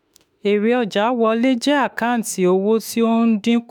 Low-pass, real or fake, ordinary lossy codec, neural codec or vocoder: none; fake; none; autoencoder, 48 kHz, 32 numbers a frame, DAC-VAE, trained on Japanese speech